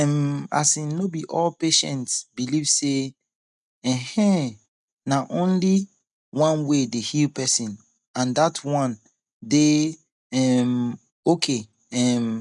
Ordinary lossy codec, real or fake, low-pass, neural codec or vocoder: none; real; 10.8 kHz; none